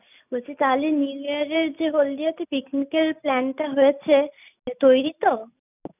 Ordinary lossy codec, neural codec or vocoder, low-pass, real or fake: none; none; 3.6 kHz; real